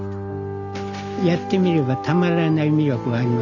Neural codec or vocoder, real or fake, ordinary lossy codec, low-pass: none; real; none; 7.2 kHz